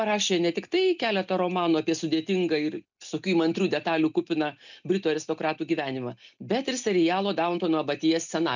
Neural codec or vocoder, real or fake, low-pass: none; real; 7.2 kHz